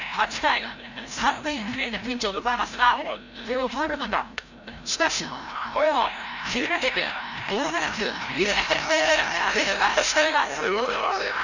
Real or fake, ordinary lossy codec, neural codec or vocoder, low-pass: fake; none; codec, 16 kHz, 0.5 kbps, FreqCodec, larger model; 7.2 kHz